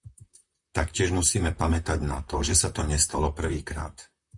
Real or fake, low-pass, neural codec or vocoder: fake; 10.8 kHz; vocoder, 44.1 kHz, 128 mel bands, Pupu-Vocoder